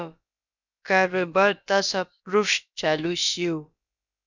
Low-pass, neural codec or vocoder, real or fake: 7.2 kHz; codec, 16 kHz, about 1 kbps, DyCAST, with the encoder's durations; fake